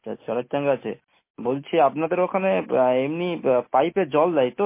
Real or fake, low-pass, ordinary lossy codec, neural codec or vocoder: real; 3.6 kHz; MP3, 24 kbps; none